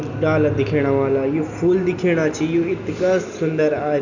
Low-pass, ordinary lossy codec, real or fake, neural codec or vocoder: 7.2 kHz; none; real; none